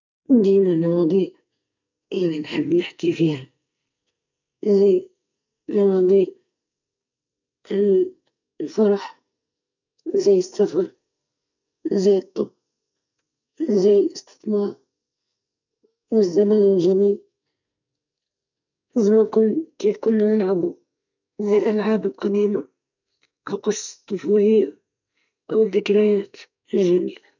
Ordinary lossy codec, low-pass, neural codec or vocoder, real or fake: none; 7.2 kHz; codec, 44.1 kHz, 2.6 kbps, SNAC; fake